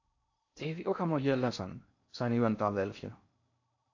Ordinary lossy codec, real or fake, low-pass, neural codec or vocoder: AAC, 48 kbps; fake; 7.2 kHz; codec, 16 kHz in and 24 kHz out, 0.6 kbps, FocalCodec, streaming, 4096 codes